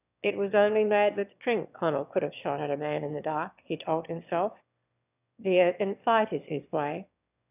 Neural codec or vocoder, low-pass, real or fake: autoencoder, 22.05 kHz, a latent of 192 numbers a frame, VITS, trained on one speaker; 3.6 kHz; fake